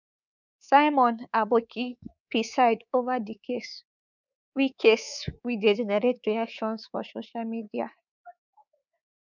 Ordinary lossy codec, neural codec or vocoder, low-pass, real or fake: none; codec, 24 kHz, 3.1 kbps, DualCodec; 7.2 kHz; fake